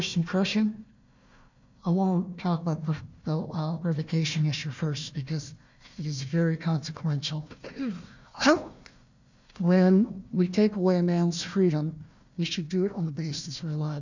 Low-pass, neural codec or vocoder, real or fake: 7.2 kHz; codec, 16 kHz, 1 kbps, FunCodec, trained on Chinese and English, 50 frames a second; fake